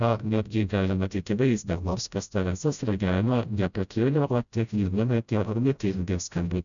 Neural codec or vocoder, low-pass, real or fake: codec, 16 kHz, 0.5 kbps, FreqCodec, smaller model; 7.2 kHz; fake